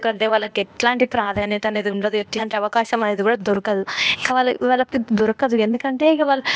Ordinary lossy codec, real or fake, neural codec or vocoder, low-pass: none; fake; codec, 16 kHz, 0.8 kbps, ZipCodec; none